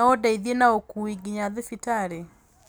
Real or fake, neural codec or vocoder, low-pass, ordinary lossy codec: real; none; none; none